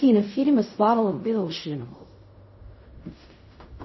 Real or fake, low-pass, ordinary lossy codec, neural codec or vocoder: fake; 7.2 kHz; MP3, 24 kbps; codec, 16 kHz in and 24 kHz out, 0.4 kbps, LongCat-Audio-Codec, fine tuned four codebook decoder